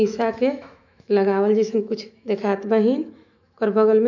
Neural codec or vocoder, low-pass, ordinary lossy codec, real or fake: autoencoder, 48 kHz, 128 numbers a frame, DAC-VAE, trained on Japanese speech; 7.2 kHz; none; fake